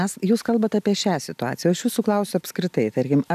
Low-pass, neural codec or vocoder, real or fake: 14.4 kHz; none; real